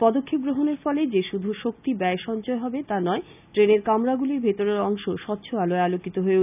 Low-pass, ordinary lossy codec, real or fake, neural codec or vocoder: 3.6 kHz; none; real; none